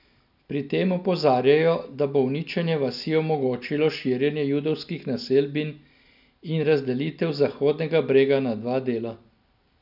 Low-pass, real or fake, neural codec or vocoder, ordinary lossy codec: 5.4 kHz; real; none; none